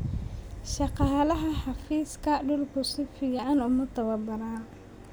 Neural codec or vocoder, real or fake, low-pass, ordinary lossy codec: none; real; none; none